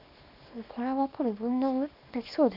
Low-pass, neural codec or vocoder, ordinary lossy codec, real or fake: 5.4 kHz; codec, 24 kHz, 0.9 kbps, WavTokenizer, small release; none; fake